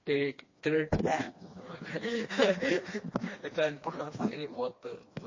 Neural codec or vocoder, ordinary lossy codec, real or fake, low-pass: codec, 16 kHz, 2 kbps, FreqCodec, smaller model; MP3, 32 kbps; fake; 7.2 kHz